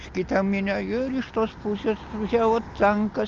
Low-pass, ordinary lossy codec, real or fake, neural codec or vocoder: 7.2 kHz; Opus, 32 kbps; real; none